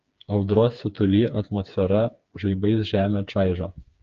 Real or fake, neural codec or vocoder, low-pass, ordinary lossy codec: fake; codec, 16 kHz, 4 kbps, FreqCodec, smaller model; 7.2 kHz; Opus, 24 kbps